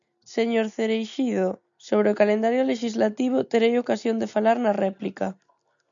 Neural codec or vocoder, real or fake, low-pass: none; real; 7.2 kHz